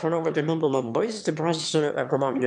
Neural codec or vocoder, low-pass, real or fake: autoencoder, 22.05 kHz, a latent of 192 numbers a frame, VITS, trained on one speaker; 9.9 kHz; fake